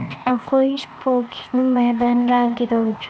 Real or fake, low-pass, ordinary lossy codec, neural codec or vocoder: fake; none; none; codec, 16 kHz, 0.8 kbps, ZipCodec